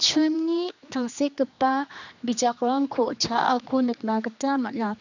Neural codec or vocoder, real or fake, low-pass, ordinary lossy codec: codec, 16 kHz, 2 kbps, X-Codec, HuBERT features, trained on general audio; fake; 7.2 kHz; none